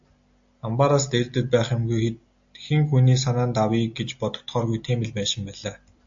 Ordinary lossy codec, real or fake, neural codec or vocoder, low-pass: AAC, 64 kbps; real; none; 7.2 kHz